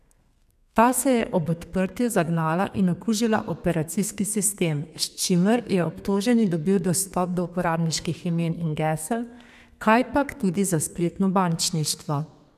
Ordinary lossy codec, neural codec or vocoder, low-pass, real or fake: none; codec, 32 kHz, 1.9 kbps, SNAC; 14.4 kHz; fake